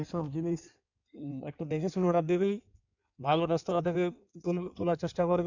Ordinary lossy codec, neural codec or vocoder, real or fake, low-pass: none; codec, 16 kHz in and 24 kHz out, 1.1 kbps, FireRedTTS-2 codec; fake; 7.2 kHz